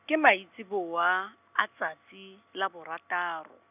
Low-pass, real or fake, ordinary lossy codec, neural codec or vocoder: 3.6 kHz; real; none; none